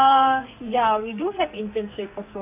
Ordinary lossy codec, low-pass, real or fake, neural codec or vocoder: none; 3.6 kHz; fake; codec, 44.1 kHz, 2.6 kbps, SNAC